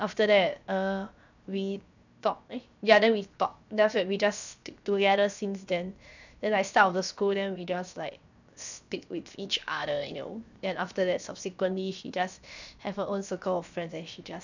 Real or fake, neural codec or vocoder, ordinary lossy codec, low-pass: fake; codec, 16 kHz, 0.7 kbps, FocalCodec; none; 7.2 kHz